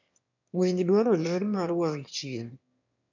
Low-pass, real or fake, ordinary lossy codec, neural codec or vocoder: 7.2 kHz; fake; none; autoencoder, 22.05 kHz, a latent of 192 numbers a frame, VITS, trained on one speaker